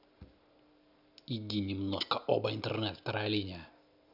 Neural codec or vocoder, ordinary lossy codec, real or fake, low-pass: none; none; real; 5.4 kHz